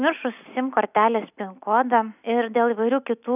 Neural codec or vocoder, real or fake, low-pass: none; real; 3.6 kHz